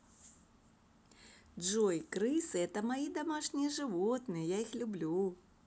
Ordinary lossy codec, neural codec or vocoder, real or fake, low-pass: none; none; real; none